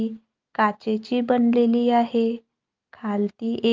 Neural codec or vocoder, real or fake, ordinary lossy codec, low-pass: none; real; Opus, 32 kbps; 7.2 kHz